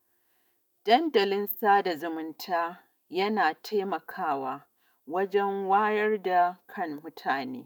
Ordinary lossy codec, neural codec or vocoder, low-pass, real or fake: none; autoencoder, 48 kHz, 128 numbers a frame, DAC-VAE, trained on Japanese speech; none; fake